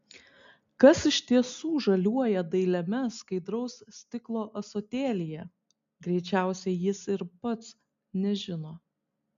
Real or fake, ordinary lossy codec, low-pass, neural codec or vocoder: real; MP3, 64 kbps; 7.2 kHz; none